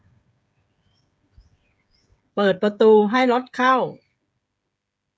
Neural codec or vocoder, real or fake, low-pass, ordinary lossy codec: codec, 16 kHz, 16 kbps, FreqCodec, smaller model; fake; none; none